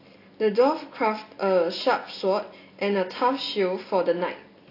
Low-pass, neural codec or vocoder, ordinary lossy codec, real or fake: 5.4 kHz; none; AAC, 32 kbps; real